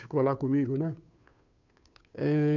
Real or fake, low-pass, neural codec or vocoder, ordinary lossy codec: fake; 7.2 kHz; codec, 16 kHz, 2 kbps, FunCodec, trained on Chinese and English, 25 frames a second; none